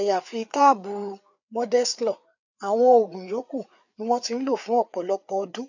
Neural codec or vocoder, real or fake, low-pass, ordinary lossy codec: codec, 16 kHz, 4 kbps, FreqCodec, larger model; fake; 7.2 kHz; none